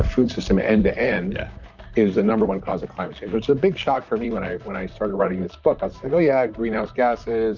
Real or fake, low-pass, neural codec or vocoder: fake; 7.2 kHz; vocoder, 44.1 kHz, 128 mel bands, Pupu-Vocoder